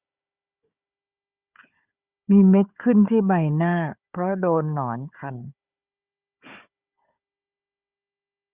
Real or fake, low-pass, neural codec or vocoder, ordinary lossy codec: fake; 3.6 kHz; codec, 16 kHz, 4 kbps, FunCodec, trained on Chinese and English, 50 frames a second; Opus, 64 kbps